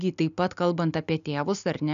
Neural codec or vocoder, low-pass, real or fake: none; 7.2 kHz; real